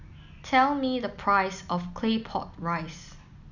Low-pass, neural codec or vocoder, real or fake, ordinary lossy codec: 7.2 kHz; none; real; none